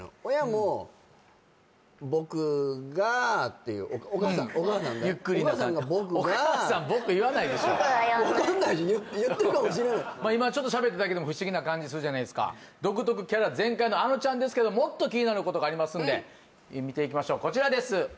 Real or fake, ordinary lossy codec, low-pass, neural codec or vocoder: real; none; none; none